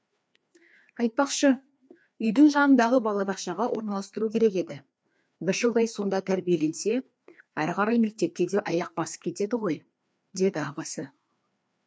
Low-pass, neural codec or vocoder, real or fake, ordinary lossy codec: none; codec, 16 kHz, 2 kbps, FreqCodec, larger model; fake; none